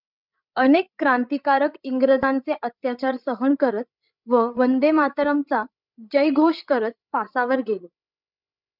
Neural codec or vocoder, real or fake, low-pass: codec, 16 kHz, 16 kbps, FreqCodec, larger model; fake; 5.4 kHz